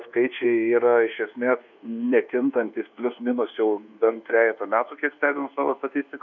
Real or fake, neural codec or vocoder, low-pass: fake; codec, 24 kHz, 1.2 kbps, DualCodec; 7.2 kHz